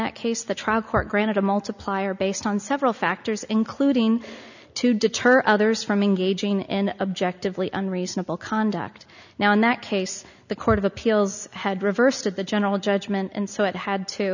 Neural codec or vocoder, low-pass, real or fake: none; 7.2 kHz; real